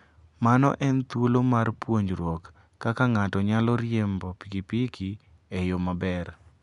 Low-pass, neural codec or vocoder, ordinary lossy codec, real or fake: 10.8 kHz; none; none; real